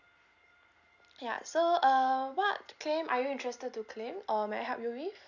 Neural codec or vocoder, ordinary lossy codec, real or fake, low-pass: none; none; real; 7.2 kHz